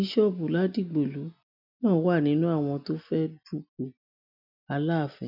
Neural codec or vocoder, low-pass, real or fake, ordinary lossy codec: none; 5.4 kHz; real; none